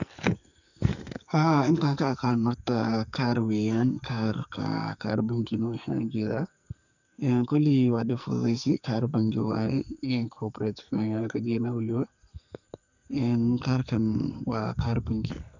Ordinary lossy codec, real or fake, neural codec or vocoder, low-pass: none; fake; codec, 44.1 kHz, 2.6 kbps, SNAC; 7.2 kHz